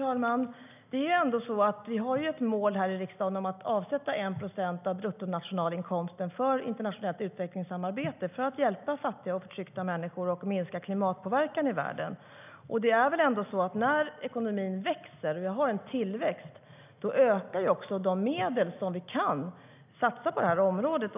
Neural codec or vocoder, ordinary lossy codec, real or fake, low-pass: none; none; real; 3.6 kHz